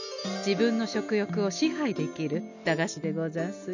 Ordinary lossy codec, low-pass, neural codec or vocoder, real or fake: none; 7.2 kHz; none; real